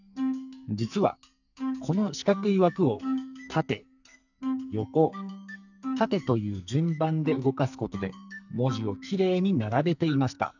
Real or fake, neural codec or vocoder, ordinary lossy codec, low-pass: fake; codec, 44.1 kHz, 2.6 kbps, SNAC; none; 7.2 kHz